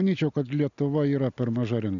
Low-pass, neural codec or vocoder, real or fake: 7.2 kHz; none; real